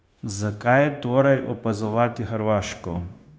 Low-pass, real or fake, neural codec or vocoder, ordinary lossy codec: none; fake; codec, 16 kHz, 0.9 kbps, LongCat-Audio-Codec; none